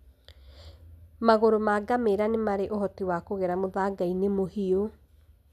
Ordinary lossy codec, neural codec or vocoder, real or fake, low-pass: none; none; real; 14.4 kHz